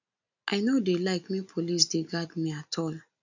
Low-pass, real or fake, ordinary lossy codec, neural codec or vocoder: 7.2 kHz; real; none; none